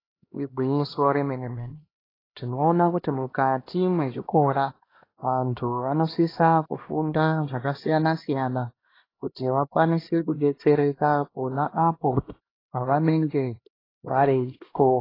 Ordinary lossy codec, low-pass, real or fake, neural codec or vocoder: AAC, 24 kbps; 5.4 kHz; fake; codec, 16 kHz, 1 kbps, X-Codec, HuBERT features, trained on LibriSpeech